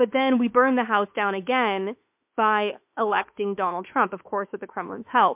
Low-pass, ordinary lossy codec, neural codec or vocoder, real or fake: 3.6 kHz; MP3, 32 kbps; autoencoder, 48 kHz, 32 numbers a frame, DAC-VAE, trained on Japanese speech; fake